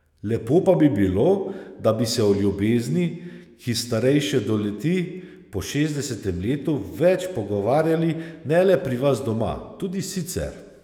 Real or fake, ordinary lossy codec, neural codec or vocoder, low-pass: fake; none; autoencoder, 48 kHz, 128 numbers a frame, DAC-VAE, trained on Japanese speech; 19.8 kHz